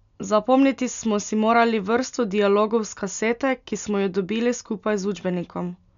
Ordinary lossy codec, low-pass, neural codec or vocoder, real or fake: none; 7.2 kHz; none; real